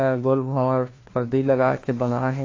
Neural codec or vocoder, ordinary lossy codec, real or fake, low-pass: codec, 16 kHz, 1 kbps, FunCodec, trained on LibriTTS, 50 frames a second; AAC, 32 kbps; fake; 7.2 kHz